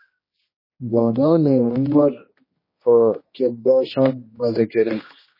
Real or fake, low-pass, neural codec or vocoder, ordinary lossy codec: fake; 5.4 kHz; codec, 16 kHz, 1 kbps, X-Codec, HuBERT features, trained on general audio; MP3, 24 kbps